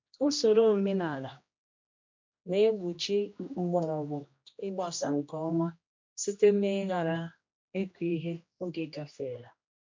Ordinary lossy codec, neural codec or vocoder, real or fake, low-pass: MP3, 48 kbps; codec, 16 kHz, 1 kbps, X-Codec, HuBERT features, trained on general audio; fake; 7.2 kHz